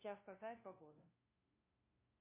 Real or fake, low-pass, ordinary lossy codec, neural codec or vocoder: fake; 3.6 kHz; MP3, 24 kbps; codec, 16 kHz, 0.5 kbps, FunCodec, trained on LibriTTS, 25 frames a second